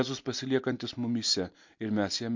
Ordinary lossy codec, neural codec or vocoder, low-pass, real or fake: MP3, 48 kbps; none; 7.2 kHz; real